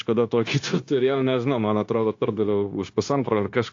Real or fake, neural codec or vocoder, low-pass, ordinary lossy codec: fake; codec, 16 kHz, 0.9 kbps, LongCat-Audio-Codec; 7.2 kHz; AAC, 48 kbps